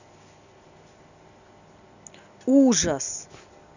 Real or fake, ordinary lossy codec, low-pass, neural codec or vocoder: real; none; 7.2 kHz; none